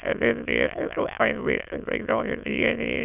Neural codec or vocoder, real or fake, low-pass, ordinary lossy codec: autoencoder, 22.05 kHz, a latent of 192 numbers a frame, VITS, trained on many speakers; fake; 3.6 kHz; none